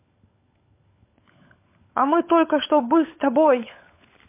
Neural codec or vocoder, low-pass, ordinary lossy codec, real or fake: codec, 16 kHz, 16 kbps, FunCodec, trained on LibriTTS, 50 frames a second; 3.6 kHz; MP3, 24 kbps; fake